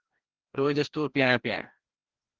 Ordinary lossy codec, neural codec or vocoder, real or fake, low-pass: Opus, 16 kbps; codec, 16 kHz, 1 kbps, FreqCodec, larger model; fake; 7.2 kHz